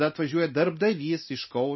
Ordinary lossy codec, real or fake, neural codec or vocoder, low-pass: MP3, 24 kbps; real; none; 7.2 kHz